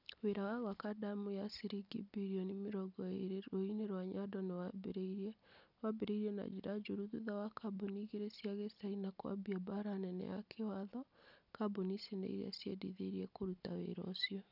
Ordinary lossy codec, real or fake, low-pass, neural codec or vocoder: none; real; 5.4 kHz; none